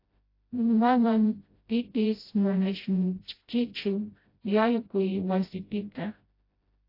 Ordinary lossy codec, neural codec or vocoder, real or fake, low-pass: AAC, 32 kbps; codec, 16 kHz, 0.5 kbps, FreqCodec, smaller model; fake; 5.4 kHz